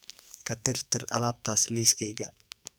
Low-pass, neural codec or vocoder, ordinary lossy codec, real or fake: none; codec, 44.1 kHz, 2.6 kbps, SNAC; none; fake